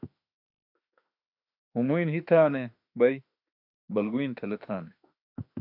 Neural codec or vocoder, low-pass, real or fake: autoencoder, 48 kHz, 32 numbers a frame, DAC-VAE, trained on Japanese speech; 5.4 kHz; fake